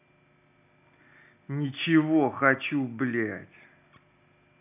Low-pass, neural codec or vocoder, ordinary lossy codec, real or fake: 3.6 kHz; none; none; real